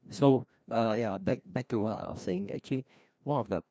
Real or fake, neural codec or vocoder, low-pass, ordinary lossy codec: fake; codec, 16 kHz, 1 kbps, FreqCodec, larger model; none; none